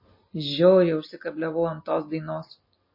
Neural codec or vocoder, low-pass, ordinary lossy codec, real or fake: none; 5.4 kHz; MP3, 24 kbps; real